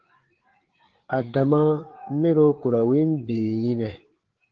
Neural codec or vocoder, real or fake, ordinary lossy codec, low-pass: codec, 16 kHz, 4 kbps, FreqCodec, larger model; fake; Opus, 16 kbps; 7.2 kHz